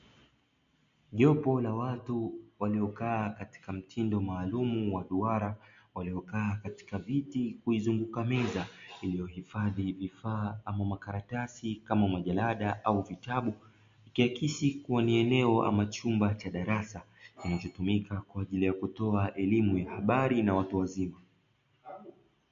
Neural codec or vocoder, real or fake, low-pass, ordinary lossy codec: none; real; 7.2 kHz; MP3, 48 kbps